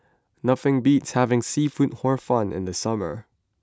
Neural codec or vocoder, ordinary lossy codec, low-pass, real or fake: none; none; none; real